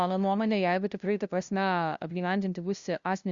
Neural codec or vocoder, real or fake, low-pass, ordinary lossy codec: codec, 16 kHz, 0.5 kbps, FunCodec, trained on LibriTTS, 25 frames a second; fake; 7.2 kHz; Opus, 64 kbps